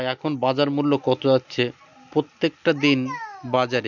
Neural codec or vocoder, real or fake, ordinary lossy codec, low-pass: none; real; none; 7.2 kHz